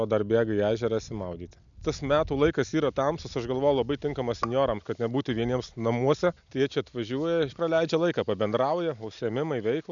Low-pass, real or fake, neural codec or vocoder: 7.2 kHz; real; none